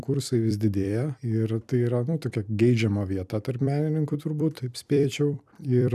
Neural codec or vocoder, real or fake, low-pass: vocoder, 44.1 kHz, 128 mel bands every 256 samples, BigVGAN v2; fake; 14.4 kHz